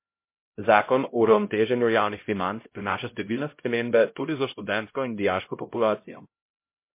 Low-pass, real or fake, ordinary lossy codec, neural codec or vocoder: 3.6 kHz; fake; MP3, 24 kbps; codec, 16 kHz, 0.5 kbps, X-Codec, HuBERT features, trained on LibriSpeech